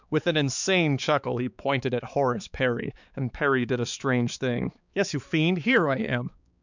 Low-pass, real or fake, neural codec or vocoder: 7.2 kHz; fake; codec, 16 kHz, 4 kbps, X-Codec, HuBERT features, trained on balanced general audio